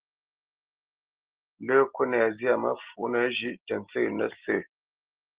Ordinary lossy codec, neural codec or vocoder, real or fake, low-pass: Opus, 16 kbps; none; real; 3.6 kHz